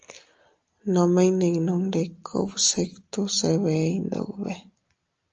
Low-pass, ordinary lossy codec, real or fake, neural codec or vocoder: 7.2 kHz; Opus, 24 kbps; real; none